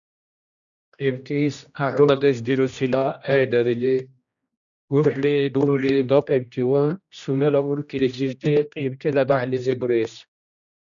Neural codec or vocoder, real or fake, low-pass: codec, 16 kHz, 1 kbps, X-Codec, HuBERT features, trained on general audio; fake; 7.2 kHz